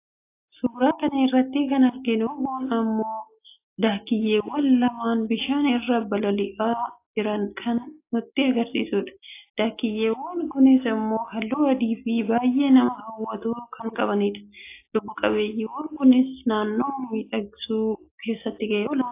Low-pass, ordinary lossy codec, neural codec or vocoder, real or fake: 3.6 kHz; AAC, 24 kbps; none; real